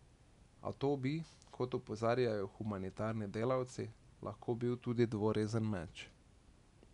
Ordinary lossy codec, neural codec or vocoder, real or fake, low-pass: none; none; real; 10.8 kHz